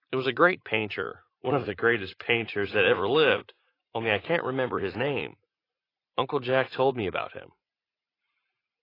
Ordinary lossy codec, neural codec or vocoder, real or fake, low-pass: AAC, 24 kbps; vocoder, 44.1 kHz, 80 mel bands, Vocos; fake; 5.4 kHz